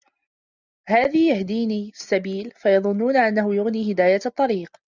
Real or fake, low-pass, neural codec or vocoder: real; 7.2 kHz; none